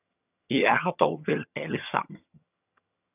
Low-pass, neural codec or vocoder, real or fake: 3.6 kHz; vocoder, 22.05 kHz, 80 mel bands, HiFi-GAN; fake